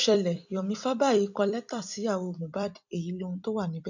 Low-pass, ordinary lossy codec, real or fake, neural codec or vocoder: 7.2 kHz; none; fake; vocoder, 44.1 kHz, 128 mel bands every 256 samples, BigVGAN v2